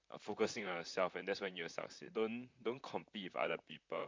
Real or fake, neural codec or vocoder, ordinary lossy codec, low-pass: fake; vocoder, 44.1 kHz, 128 mel bands, Pupu-Vocoder; MP3, 64 kbps; 7.2 kHz